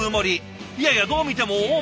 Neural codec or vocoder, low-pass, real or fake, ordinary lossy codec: none; none; real; none